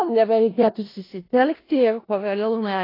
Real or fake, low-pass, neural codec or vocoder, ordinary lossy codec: fake; 5.4 kHz; codec, 16 kHz in and 24 kHz out, 0.4 kbps, LongCat-Audio-Codec, four codebook decoder; AAC, 24 kbps